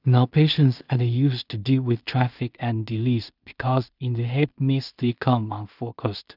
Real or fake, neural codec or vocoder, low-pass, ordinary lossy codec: fake; codec, 16 kHz in and 24 kHz out, 0.4 kbps, LongCat-Audio-Codec, two codebook decoder; 5.4 kHz; none